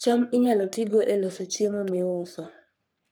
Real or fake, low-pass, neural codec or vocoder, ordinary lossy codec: fake; none; codec, 44.1 kHz, 3.4 kbps, Pupu-Codec; none